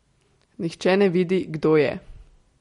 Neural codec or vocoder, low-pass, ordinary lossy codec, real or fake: none; 10.8 kHz; MP3, 48 kbps; real